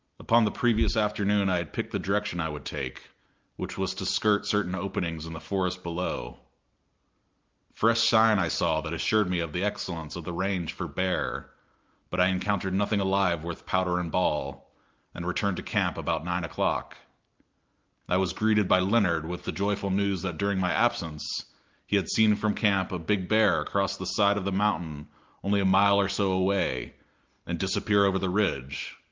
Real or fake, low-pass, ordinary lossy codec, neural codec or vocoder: real; 7.2 kHz; Opus, 24 kbps; none